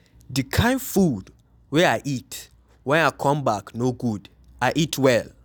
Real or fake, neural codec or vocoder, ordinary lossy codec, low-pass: real; none; none; none